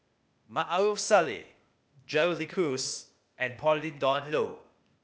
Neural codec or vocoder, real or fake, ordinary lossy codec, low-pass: codec, 16 kHz, 0.8 kbps, ZipCodec; fake; none; none